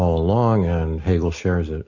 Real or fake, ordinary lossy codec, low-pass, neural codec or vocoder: real; AAC, 48 kbps; 7.2 kHz; none